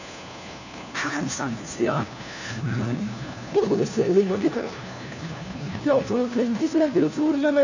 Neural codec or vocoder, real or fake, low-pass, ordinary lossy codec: codec, 16 kHz, 1 kbps, FunCodec, trained on LibriTTS, 50 frames a second; fake; 7.2 kHz; none